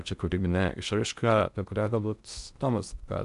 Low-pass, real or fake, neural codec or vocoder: 10.8 kHz; fake; codec, 16 kHz in and 24 kHz out, 0.6 kbps, FocalCodec, streaming, 2048 codes